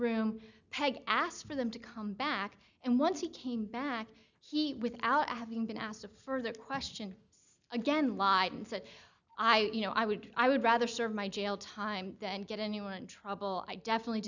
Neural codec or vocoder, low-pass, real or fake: none; 7.2 kHz; real